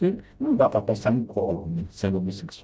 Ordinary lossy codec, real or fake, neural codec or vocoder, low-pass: none; fake; codec, 16 kHz, 0.5 kbps, FreqCodec, smaller model; none